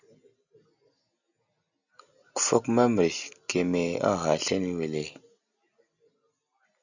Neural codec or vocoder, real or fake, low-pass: none; real; 7.2 kHz